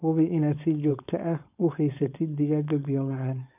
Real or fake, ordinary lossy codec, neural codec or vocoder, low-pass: fake; none; codec, 16 kHz, 4.8 kbps, FACodec; 3.6 kHz